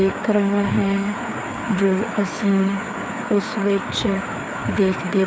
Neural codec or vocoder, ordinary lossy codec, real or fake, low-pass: codec, 16 kHz, 4 kbps, FreqCodec, larger model; none; fake; none